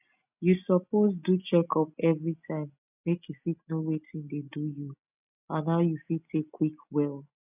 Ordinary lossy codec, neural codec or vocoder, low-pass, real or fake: none; none; 3.6 kHz; real